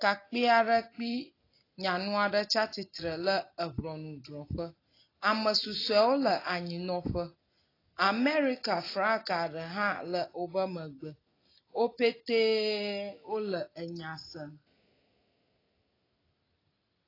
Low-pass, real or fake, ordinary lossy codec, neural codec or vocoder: 5.4 kHz; real; AAC, 24 kbps; none